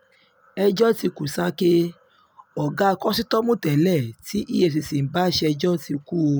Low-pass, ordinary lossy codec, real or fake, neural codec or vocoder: none; none; real; none